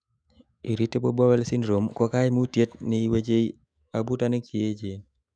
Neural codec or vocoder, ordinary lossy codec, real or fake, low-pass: autoencoder, 48 kHz, 128 numbers a frame, DAC-VAE, trained on Japanese speech; none; fake; 9.9 kHz